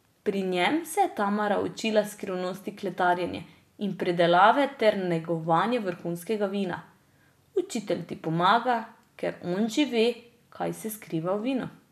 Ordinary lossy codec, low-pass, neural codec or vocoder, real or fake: none; 14.4 kHz; none; real